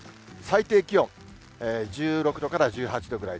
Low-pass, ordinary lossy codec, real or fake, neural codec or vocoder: none; none; real; none